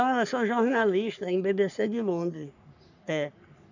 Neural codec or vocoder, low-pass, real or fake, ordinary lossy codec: codec, 44.1 kHz, 3.4 kbps, Pupu-Codec; 7.2 kHz; fake; none